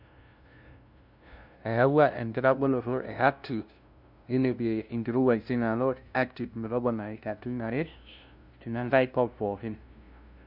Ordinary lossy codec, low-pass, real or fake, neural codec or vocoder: none; 5.4 kHz; fake; codec, 16 kHz, 0.5 kbps, FunCodec, trained on LibriTTS, 25 frames a second